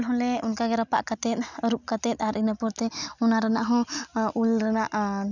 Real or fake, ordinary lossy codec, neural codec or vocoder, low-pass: real; none; none; 7.2 kHz